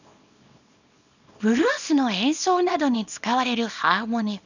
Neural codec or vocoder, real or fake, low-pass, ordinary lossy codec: codec, 24 kHz, 0.9 kbps, WavTokenizer, small release; fake; 7.2 kHz; none